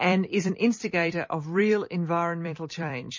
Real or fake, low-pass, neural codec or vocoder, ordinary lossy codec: fake; 7.2 kHz; vocoder, 44.1 kHz, 128 mel bands every 256 samples, BigVGAN v2; MP3, 32 kbps